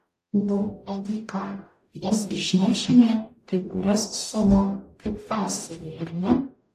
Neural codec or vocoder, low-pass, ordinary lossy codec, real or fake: codec, 44.1 kHz, 0.9 kbps, DAC; 14.4 kHz; AAC, 64 kbps; fake